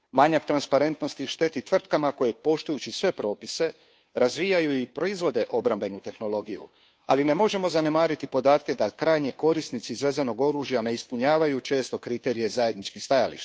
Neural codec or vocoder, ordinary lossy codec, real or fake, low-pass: codec, 16 kHz, 2 kbps, FunCodec, trained on Chinese and English, 25 frames a second; none; fake; none